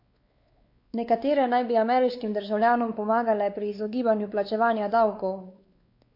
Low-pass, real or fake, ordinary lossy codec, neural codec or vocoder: 5.4 kHz; fake; MP3, 32 kbps; codec, 16 kHz, 4 kbps, X-Codec, WavLM features, trained on Multilingual LibriSpeech